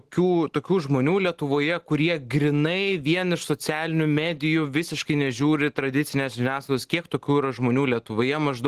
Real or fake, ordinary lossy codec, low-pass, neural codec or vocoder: real; Opus, 16 kbps; 14.4 kHz; none